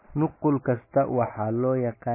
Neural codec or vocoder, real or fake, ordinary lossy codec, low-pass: none; real; MP3, 16 kbps; 3.6 kHz